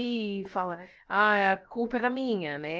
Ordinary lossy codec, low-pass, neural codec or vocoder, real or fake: Opus, 24 kbps; 7.2 kHz; codec, 16 kHz, about 1 kbps, DyCAST, with the encoder's durations; fake